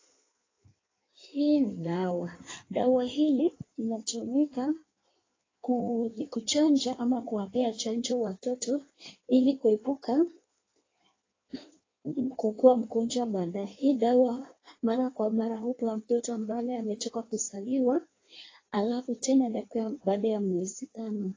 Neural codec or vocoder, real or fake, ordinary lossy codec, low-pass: codec, 16 kHz in and 24 kHz out, 1.1 kbps, FireRedTTS-2 codec; fake; AAC, 32 kbps; 7.2 kHz